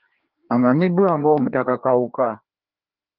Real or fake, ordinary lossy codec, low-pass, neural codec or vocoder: fake; Opus, 32 kbps; 5.4 kHz; codec, 16 kHz, 2 kbps, FreqCodec, larger model